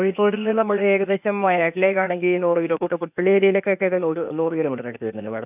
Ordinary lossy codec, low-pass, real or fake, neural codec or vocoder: none; 3.6 kHz; fake; codec, 16 kHz, 0.8 kbps, ZipCodec